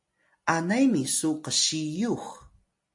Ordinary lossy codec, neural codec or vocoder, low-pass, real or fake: MP3, 48 kbps; none; 10.8 kHz; real